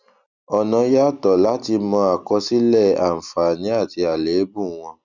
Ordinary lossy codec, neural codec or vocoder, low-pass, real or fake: none; none; 7.2 kHz; real